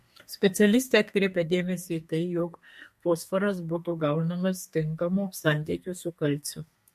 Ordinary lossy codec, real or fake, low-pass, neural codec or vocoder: MP3, 64 kbps; fake; 14.4 kHz; codec, 32 kHz, 1.9 kbps, SNAC